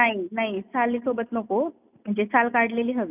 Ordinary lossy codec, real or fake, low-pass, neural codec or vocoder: none; real; 3.6 kHz; none